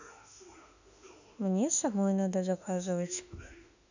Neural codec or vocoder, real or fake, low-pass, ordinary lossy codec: autoencoder, 48 kHz, 32 numbers a frame, DAC-VAE, trained on Japanese speech; fake; 7.2 kHz; none